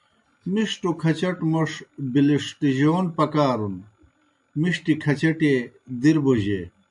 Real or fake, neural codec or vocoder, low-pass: real; none; 10.8 kHz